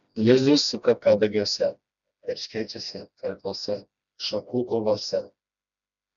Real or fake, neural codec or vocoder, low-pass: fake; codec, 16 kHz, 1 kbps, FreqCodec, smaller model; 7.2 kHz